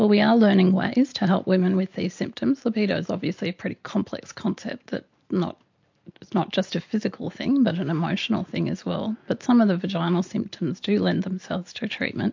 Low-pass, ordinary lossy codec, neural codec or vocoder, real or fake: 7.2 kHz; MP3, 48 kbps; none; real